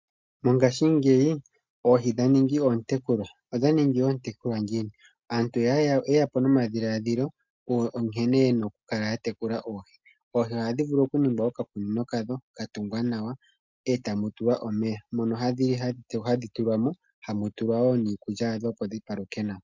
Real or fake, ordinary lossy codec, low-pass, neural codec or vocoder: real; MP3, 64 kbps; 7.2 kHz; none